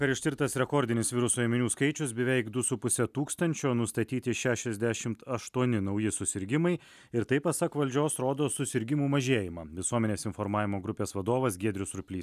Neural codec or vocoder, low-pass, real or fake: none; 14.4 kHz; real